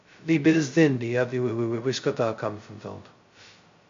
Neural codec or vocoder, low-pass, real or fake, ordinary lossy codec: codec, 16 kHz, 0.2 kbps, FocalCodec; 7.2 kHz; fake; MP3, 48 kbps